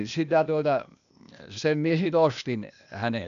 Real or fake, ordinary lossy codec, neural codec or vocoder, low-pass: fake; none; codec, 16 kHz, 0.8 kbps, ZipCodec; 7.2 kHz